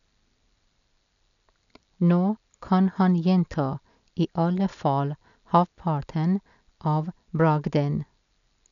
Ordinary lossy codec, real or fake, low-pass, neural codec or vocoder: none; real; 7.2 kHz; none